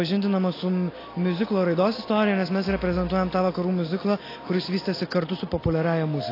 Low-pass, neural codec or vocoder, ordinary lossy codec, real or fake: 5.4 kHz; none; AAC, 24 kbps; real